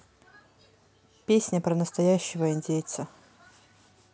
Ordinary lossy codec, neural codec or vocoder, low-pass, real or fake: none; none; none; real